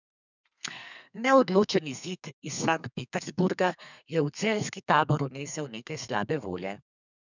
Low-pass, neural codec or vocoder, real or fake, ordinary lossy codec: 7.2 kHz; codec, 32 kHz, 1.9 kbps, SNAC; fake; none